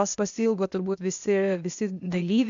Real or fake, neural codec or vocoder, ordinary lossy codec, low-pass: fake; codec, 16 kHz, 0.8 kbps, ZipCodec; AAC, 64 kbps; 7.2 kHz